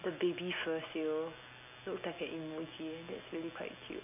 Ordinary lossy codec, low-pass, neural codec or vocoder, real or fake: AAC, 32 kbps; 3.6 kHz; none; real